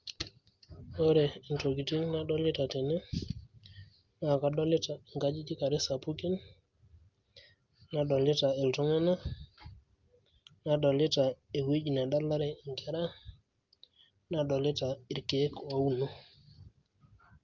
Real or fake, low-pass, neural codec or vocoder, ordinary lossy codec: real; 7.2 kHz; none; Opus, 32 kbps